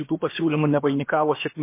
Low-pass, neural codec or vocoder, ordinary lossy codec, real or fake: 3.6 kHz; codec, 16 kHz, about 1 kbps, DyCAST, with the encoder's durations; MP3, 24 kbps; fake